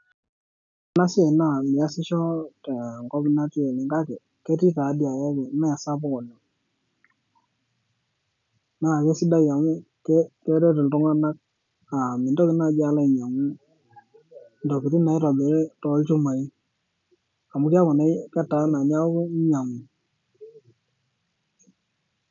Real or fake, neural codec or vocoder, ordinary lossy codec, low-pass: real; none; none; 7.2 kHz